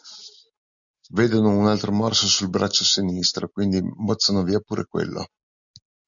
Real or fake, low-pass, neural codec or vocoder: real; 7.2 kHz; none